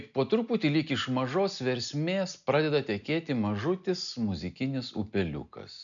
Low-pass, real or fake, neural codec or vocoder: 7.2 kHz; real; none